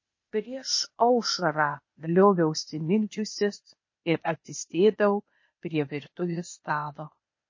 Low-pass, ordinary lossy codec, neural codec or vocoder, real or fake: 7.2 kHz; MP3, 32 kbps; codec, 16 kHz, 0.8 kbps, ZipCodec; fake